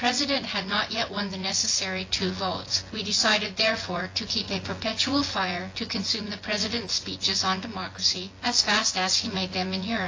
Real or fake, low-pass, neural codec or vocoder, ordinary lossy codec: fake; 7.2 kHz; vocoder, 24 kHz, 100 mel bands, Vocos; AAC, 32 kbps